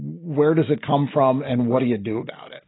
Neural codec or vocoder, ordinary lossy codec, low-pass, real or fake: none; AAC, 16 kbps; 7.2 kHz; real